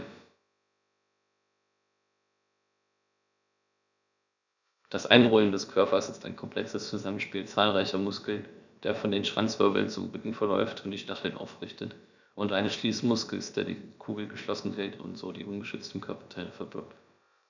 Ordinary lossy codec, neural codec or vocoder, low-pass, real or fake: none; codec, 16 kHz, about 1 kbps, DyCAST, with the encoder's durations; 7.2 kHz; fake